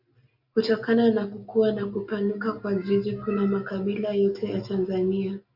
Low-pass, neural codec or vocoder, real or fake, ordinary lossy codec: 5.4 kHz; none; real; AAC, 48 kbps